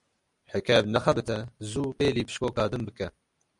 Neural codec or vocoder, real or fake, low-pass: none; real; 10.8 kHz